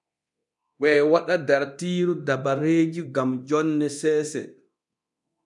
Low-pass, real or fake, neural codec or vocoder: 10.8 kHz; fake; codec, 24 kHz, 0.9 kbps, DualCodec